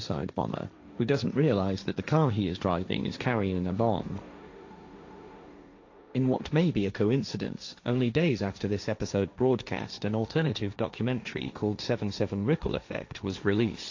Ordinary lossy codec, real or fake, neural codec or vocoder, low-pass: AAC, 48 kbps; fake; codec, 16 kHz, 1.1 kbps, Voila-Tokenizer; 7.2 kHz